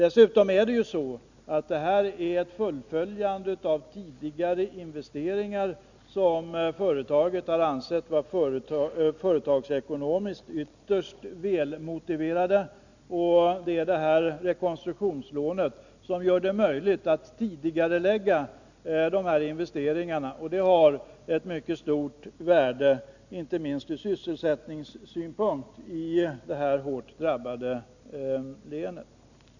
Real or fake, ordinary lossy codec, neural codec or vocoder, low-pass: real; none; none; 7.2 kHz